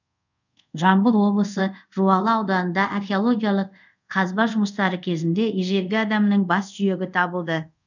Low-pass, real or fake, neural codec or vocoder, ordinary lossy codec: 7.2 kHz; fake; codec, 24 kHz, 0.5 kbps, DualCodec; none